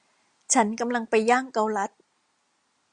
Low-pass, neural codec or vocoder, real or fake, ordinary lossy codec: 9.9 kHz; none; real; Opus, 64 kbps